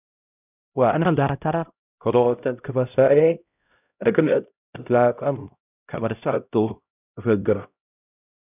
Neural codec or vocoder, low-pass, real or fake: codec, 16 kHz, 0.5 kbps, X-Codec, HuBERT features, trained on LibriSpeech; 3.6 kHz; fake